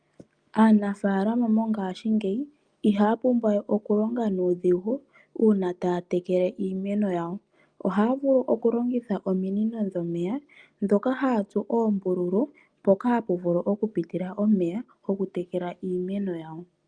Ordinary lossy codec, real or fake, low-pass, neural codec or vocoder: Opus, 32 kbps; real; 9.9 kHz; none